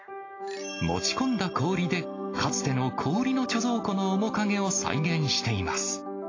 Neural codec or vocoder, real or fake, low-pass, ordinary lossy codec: none; real; 7.2 kHz; AAC, 32 kbps